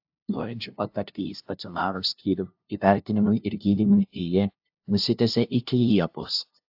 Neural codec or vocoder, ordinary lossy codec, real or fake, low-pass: codec, 16 kHz, 0.5 kbps, FunCodec, trained on LibriTTS, 25 frames a second; AAC, 48 kbps; fake; 5.4 kHz